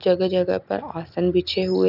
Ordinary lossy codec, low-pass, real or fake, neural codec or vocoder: none; 5.4 kHz; real; none